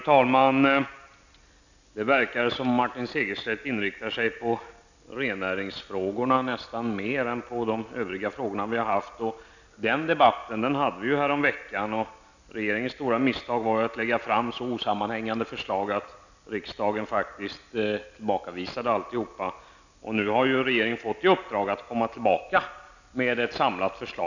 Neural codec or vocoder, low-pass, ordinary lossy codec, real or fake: none; 7.2 kHz; none; real